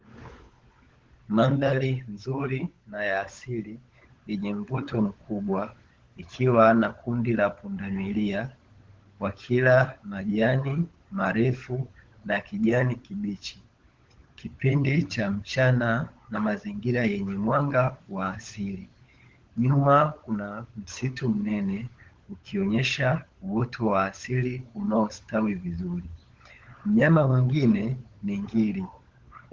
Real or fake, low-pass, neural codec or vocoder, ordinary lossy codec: fake; 7.2 kHz; codec, 16 kHz, 16 kbps, FunCodec, trained on LibriTTS, 50 frames a second; Opus, 16 kbps